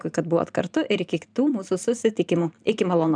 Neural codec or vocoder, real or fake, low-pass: vocoder, 44.1 kHz, 128 mel bands every 512 samples, BigVGAN v2; fake; 9.9 kHz